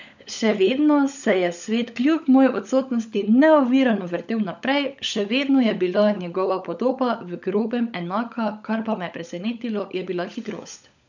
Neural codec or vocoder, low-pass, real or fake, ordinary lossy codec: codec, 16 kHz, 16 kbps, FunCodec, trained on LibriTTS, 50 frames a second; 7.2 kHz; fake; none